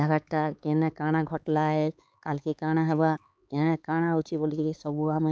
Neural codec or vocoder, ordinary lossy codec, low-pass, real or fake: codec, 16 kHz, 4 kbps, X-Codec, HuBERT features, trained on LibriSpeech; none; none; fake